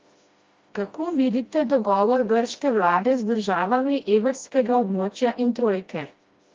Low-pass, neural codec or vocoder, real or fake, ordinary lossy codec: 7.2 kHz; codec, 16 kHz, 1 kbps, FreqCodec, smaller model; fake; Opus, 32 kbps